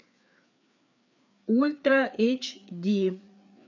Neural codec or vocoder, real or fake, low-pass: codec, 16 kHz, 4 kbps, FreqCodec, larger model; fake; 7.2 kHz